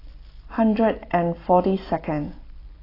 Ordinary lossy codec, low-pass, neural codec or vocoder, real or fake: AAC, 24 kbps; 5.4 kHz; none; real